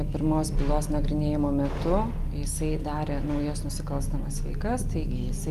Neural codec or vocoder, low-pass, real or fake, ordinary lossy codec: none; 14.4 kHz; real; Opus, 32 kbps